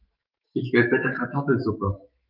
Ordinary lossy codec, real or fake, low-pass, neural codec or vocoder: Opus, 24 kbps; fake; 5.4 kHz; autoencoder, 48 kHz, 128 numbers a frame, DAC-VAE, trained on Japanese speech